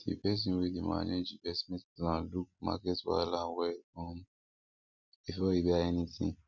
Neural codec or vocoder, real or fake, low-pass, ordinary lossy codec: none; real; 7.2 kHz; none